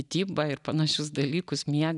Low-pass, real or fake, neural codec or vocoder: 10.8 kHz; real; none